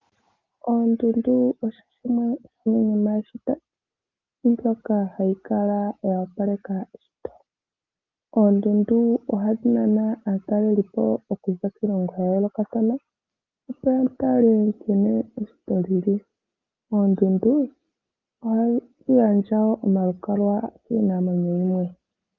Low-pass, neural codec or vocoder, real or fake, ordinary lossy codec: 7.2 kHz; none; real; Opus, 24 kbps